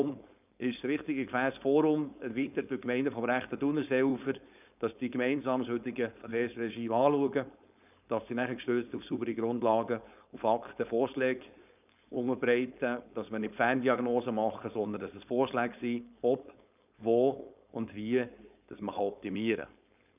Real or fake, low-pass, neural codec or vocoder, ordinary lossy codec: fake; 3.6 kHz; codec, 16 kHz, 4.8 kbps, FACodec; none